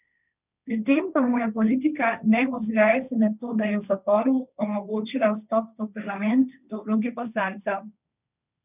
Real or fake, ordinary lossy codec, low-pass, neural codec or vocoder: fake; none; 3.6 kHz; codec, 16 kHz, 1.1 kbps, Voila-Tokenizer